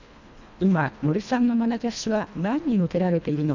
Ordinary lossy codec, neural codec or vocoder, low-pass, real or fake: none; codec, 24 kHz, 1.5 kbps, HILCodec; 7.2 kHz; fake